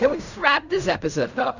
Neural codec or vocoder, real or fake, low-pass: codec, 16 kHz in and 24 kHz out, 0.4 kbps, LongCat-Audio-Codec, fine tuned four codebook decoder; fake; 7.2 kHz